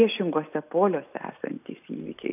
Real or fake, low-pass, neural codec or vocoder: real; 3.6 kHz; none